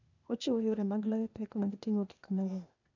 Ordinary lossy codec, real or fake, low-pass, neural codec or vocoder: none; fake; 7.2 kHz; codec, 16 kHz, 0.8 kbps, ZipCodec